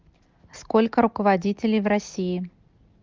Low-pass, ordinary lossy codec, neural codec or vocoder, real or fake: 7.2 kHz; Opus, 32 kbps; none; real